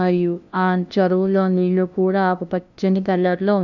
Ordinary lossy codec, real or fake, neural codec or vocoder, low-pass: none; fake; codec, 16 kHz, 0.5 kbps, FunCodec, trained on LibriTTS, 25 frames a second; 7.2 kHz